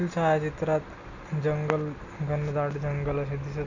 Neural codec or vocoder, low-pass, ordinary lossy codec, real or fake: none; 7.2 kHz; none; real